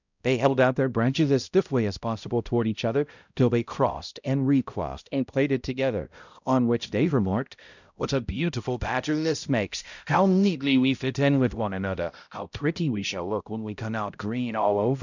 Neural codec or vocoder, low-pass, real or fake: codec, 16 kHz, 0.5 kbps, X-Codec, HuBERT features, trained on balanced general audio; 7.2 kHz; fake